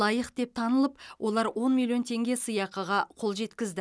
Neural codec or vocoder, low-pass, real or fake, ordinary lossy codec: none; none; real; none